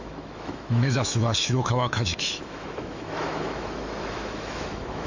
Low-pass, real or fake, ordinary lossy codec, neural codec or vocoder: 7.2 kHz; fake; none; autoencoder, 48 kHz, 128 numbers a frame, DAC-VAE, trained on Japanese speech